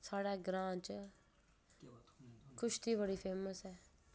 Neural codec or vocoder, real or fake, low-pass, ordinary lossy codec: none; real; none; none